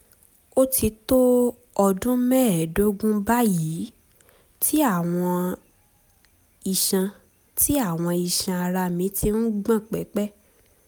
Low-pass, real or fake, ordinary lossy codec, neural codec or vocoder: none; real; none; none